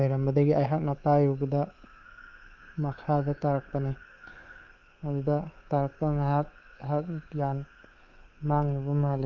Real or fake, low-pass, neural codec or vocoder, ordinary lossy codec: fake; 7.2 kHz; codec, 24 kHz, 3.1 kbps, DualCodec; Opus, 24 kbps